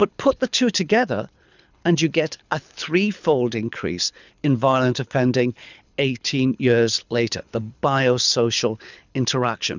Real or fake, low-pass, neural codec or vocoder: fake; 7.2 kHz; codec, 24 kHz, 6 kbps, HILCodec